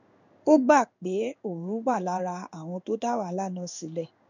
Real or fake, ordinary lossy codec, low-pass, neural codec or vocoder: fake; none; 7.2 kHz; codec, 16 kHz in and 24 kHz out, 1 kbps, XY-Tokenizer